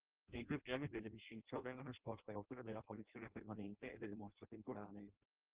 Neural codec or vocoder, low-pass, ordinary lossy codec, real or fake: codec, 16 kHz in and 24 kHz out, 0.6 kbps, FireRedTTS-2 codec; 3.6 kHz; Opus, 16 kbps; fake